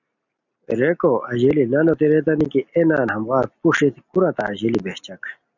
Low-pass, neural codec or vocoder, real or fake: 7.2 kHz; none; real